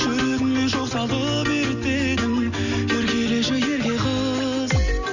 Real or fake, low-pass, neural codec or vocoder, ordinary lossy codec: real; 7.2 kHz; none; none